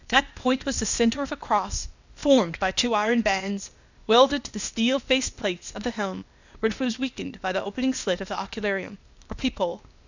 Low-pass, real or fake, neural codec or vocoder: 7.2 kHz; fake; codec, 16 kHz, 0.8 kbps, ZipCodec